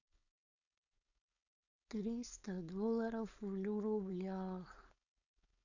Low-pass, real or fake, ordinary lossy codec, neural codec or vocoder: 7.2 kHz; fake; none; codec, 16 kHz, 4.8 kbps, FACodec